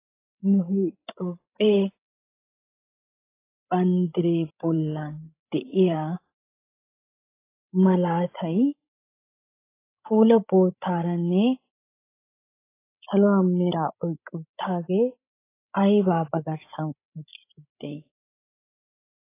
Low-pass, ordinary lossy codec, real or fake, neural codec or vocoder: 3.6 kHz; AAC, 24 kbps; fake; codec, 16 kHz, 16 kbps, FreqCodec, larger model